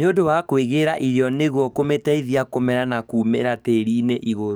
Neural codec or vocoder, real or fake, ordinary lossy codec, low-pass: codec, 44.1 kHz, 7.8 kbps, DAC; fake; none; none